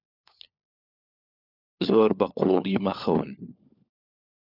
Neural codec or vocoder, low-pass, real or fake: codec, 16 kHz, 4 kbps, FunCodec, trained on LibriTTS, 50 frames a second; 5.4 kHz; fake